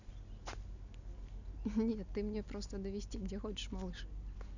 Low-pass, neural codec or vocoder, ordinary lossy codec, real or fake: 7.2 kHz; none; none; real